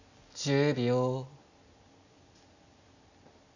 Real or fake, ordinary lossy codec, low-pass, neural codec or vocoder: real; none; 7.2 kHz; none